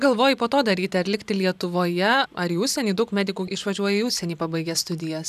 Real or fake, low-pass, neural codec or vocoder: fake; 14.4 kHz; vocoder, 44.1 kHz, 128 mel bands every 512 samples, BigVGAN v2